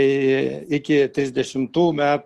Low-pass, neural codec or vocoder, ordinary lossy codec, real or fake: 14.4 kHz; none; Opus, 32 kbps; real